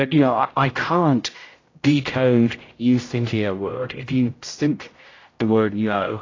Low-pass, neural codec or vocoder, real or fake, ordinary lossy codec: 7.2 kHz; codec, 16 kHz, 0.5 kbps, X-Codec, HuBERT features, trained on general audio; fake; AAC, 32 kbps